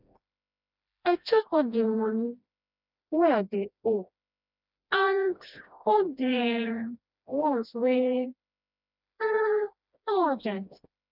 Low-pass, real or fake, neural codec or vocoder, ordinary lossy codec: 5.4 kHz; fake; codec, 16 kHz, 1 kbps, FreqCodec, smaller model; none